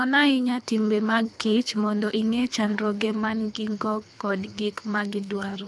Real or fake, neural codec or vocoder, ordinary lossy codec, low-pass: fake; codec, 24 kHz, 3 kbps, HILCodec; none; none